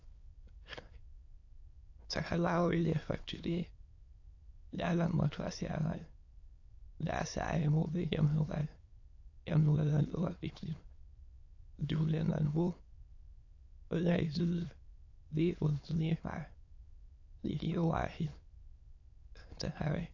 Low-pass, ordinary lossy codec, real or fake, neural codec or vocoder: 7.2 kHz; AAC, 48 kbps; fake; autoencoder, 22.05 kHz, a latent of 192 numbers a frame, VITS, trained on many speakers